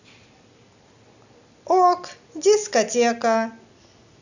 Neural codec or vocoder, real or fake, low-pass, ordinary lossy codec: none; real; 7.2 kHz; none